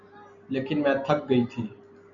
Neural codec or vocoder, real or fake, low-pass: none; real; 7.2 kHz